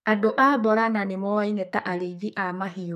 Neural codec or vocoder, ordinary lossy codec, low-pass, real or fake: codec, 44.1 kHz, 2.6 kbps, SNAC; none; 14.4 kHz; fake